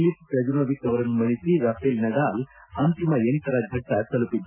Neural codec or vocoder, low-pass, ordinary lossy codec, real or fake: none; 3.6 kHz; none; real